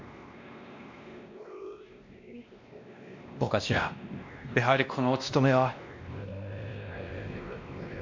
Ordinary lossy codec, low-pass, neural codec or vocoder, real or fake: MP3, 64 kbps; 7.2 kHz; codec, 16 kHz, 1 kbps, X-Codec, WavLM features, trained on Multilingual LibriSpeech; fake